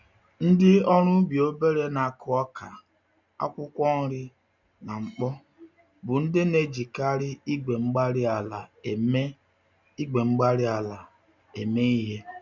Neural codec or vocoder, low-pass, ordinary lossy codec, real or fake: none; 7.2 kHz; none; real